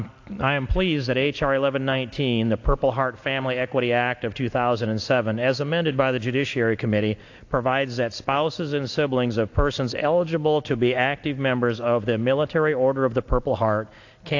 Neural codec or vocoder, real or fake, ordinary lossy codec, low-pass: none; real; AAC, 48 kbps; 7.2 kHz